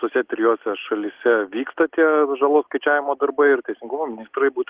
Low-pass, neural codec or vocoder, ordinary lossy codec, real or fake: 3.6 kHz; none; Opus, 16 kbps; real